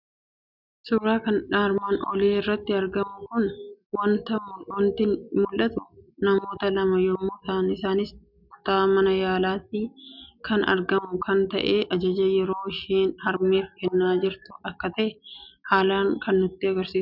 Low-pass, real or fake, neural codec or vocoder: 5.4 kHz; real; none